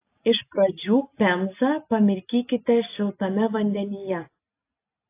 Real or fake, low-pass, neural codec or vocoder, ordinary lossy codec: real; 3.6 kHz; none; AAC, 24 kbps